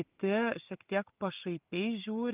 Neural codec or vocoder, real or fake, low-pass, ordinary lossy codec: none; real; 3.6 kHz; Opus, 32 kbps